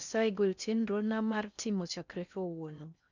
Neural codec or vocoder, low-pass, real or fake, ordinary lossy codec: codec, 16 kHz in and 24 kHz out, 0.6 kbps, FocalCodec, streaming, 4096 codes; 7.2 kHz; fake; none